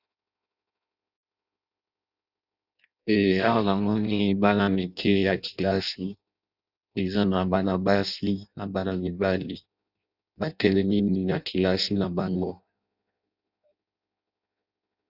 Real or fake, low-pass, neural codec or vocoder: fake; 5.4 kHz; codec, 16 kHz in and 24 kHz out, 0.6 kbps, FireRedTTS-2 codec